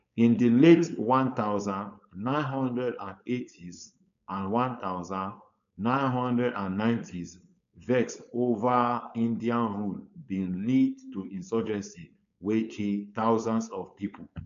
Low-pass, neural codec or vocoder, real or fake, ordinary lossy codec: 7.2 kHz; codec, 16 kHz, 4.8 kbps, FACodec; fake; none